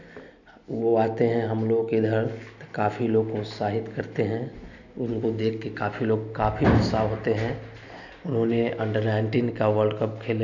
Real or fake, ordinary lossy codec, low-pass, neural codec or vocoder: real; none; 7.2 kHz; none